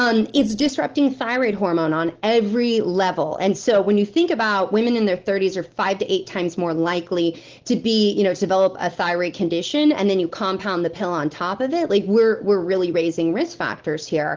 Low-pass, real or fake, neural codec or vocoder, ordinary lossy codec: 7.2 kHz; real; none; Opus, 16 kbps